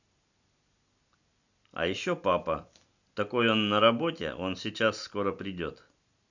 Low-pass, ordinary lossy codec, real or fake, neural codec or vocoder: 7.2 kHz; none; real; none